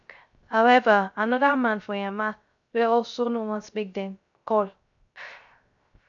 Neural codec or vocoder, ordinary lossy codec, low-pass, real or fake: codec, 16 kHz, 0.3 kbps, FocalCodec; AAC, 64 kbps; 7.2 kHz; fake